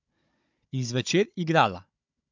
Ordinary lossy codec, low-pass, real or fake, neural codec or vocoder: MP3, 64 kbps; 7.2 kHz; fake; codec, 16 kHz, 16 kbps, FunCodec, trained on Chinese and English, 50 frames a second